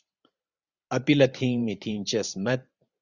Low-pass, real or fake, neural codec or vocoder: 7.2 kHz; fake; vocoder, 44.1 kHz, 128 mel bands every 512 samples, BigVGAN v2